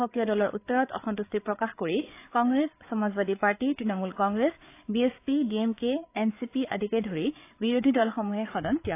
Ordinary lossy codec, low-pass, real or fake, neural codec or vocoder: AAC, 24 kbps; 3.6 kHz; fake; codec, 16 kHz, 8 kbps, FreqCodec, larger model